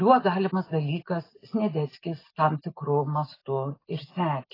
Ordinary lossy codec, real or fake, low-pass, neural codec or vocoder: AAC, 24 kbps; real; 5.4 kHz; none